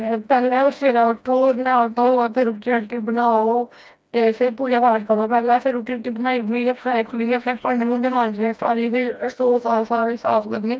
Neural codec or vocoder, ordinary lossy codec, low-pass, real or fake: codec, 16 kHz, 1 kbps, FreqCodec, smaller model; none; none; fake